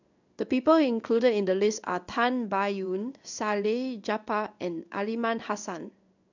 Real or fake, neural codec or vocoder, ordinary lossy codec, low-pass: fake; codec, 16 kHz in and 24 kHz out, 1 kbps, XY-Tokenizer; none; 7.2 kHz